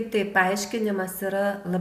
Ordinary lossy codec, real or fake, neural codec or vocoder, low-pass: MP3, 96 kbps; real; none; 14.4 kHz